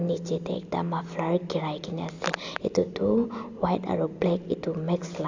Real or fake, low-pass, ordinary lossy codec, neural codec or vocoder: real; 7.2 kHz; AAC, 48 kbps; none